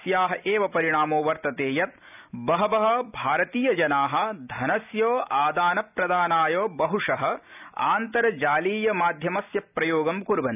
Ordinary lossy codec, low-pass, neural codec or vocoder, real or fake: none; 3.6 kHz; none; real